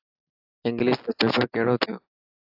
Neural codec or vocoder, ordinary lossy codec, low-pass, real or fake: none; AAC, 48 kbps; 5.4 kHz; real